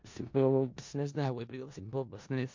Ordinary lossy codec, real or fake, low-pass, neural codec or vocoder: MP3, 48 kbps; fake; 7.2 kHz; codec, 16 kHz in and 24 kHz out, 0.4 kbps, LongCat-Audio-Codec, four codebook decoder